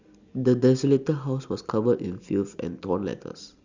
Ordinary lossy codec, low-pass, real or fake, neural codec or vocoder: Opus, 64 kbps; 7.2 kHz; real; none